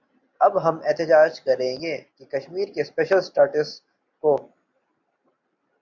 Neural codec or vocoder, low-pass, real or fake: none; 7.2 kHz; real